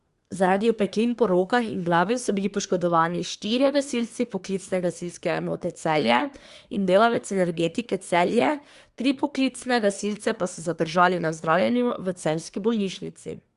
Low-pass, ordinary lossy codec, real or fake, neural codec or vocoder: 10.8 kHz; Opus, 64 kbps; fake; codec, 24 kHz, 1 kbps, SNAC